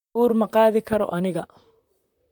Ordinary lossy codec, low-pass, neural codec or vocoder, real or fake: none; 19.8 kHz; vocoder, 44.1 kHz, 128 mel bands, Pupu-Vocoder; fake